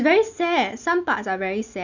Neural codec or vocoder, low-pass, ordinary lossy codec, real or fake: none; 7.2 kHz; none; real